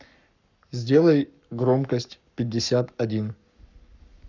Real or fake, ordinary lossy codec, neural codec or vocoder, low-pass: fake; none; codec, 44.1 kHz, 7.8 kbps, Pupu-Codec; 7.2 kHz